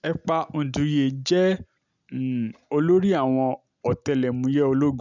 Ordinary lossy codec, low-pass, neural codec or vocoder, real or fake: none; 7.2 kHz; none; real